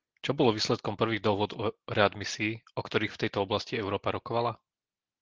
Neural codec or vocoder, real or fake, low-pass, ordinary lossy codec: none; real; 7.2 kHz; Opus, 16 kbps